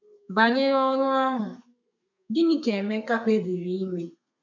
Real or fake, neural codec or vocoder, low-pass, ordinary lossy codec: fake; codec, 32 kHz, 1.9 kbps, SNAC; 7.2 kHz; none